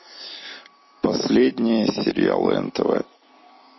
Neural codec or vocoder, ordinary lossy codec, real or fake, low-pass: none; MP3, 24 kbps; real; 7.2 kHz